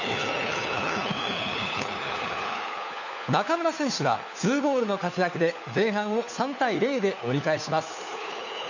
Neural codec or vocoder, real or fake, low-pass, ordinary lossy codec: codec, 16 kHz, 4 kbps, FunCodec, trained on LibriTTS, 50 frames a second; fake; 7.2 kHz; none